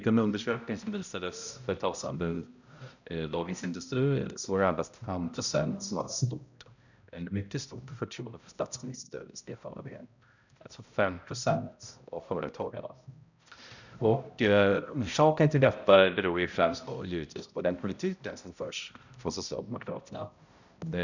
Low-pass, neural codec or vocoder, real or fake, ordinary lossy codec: 7.2 kHz; codec, 16 kHz, 0.5 kbps, X-Codec, HuBERT features, trained on balanced general audio; fake; none